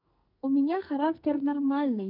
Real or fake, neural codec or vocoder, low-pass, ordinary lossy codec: fake; codec, 44.1 kHz, 2.6 kbps, SNAC; 5.4 kHz; none